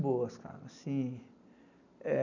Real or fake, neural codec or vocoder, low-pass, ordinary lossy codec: real; none; 7.2 kHz; none